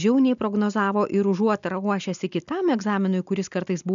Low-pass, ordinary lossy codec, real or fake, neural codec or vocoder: 7.2 kHz; MP3, 96 kbps; real; none